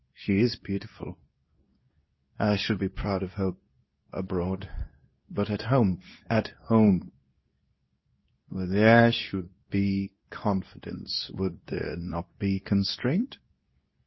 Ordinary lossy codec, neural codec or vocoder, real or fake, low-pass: MP3, 24 kbps; codec, 24 kHz, 0.9 kbps, WavTokenizer, medium speech release version 2; fake; 7.2 kHz